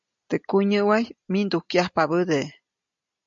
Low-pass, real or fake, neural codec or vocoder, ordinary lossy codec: 7.2 kHz; real; none; MP3, 48 kbps